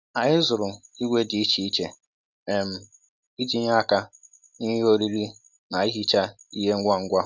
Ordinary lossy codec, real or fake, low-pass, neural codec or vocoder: none; real; none; none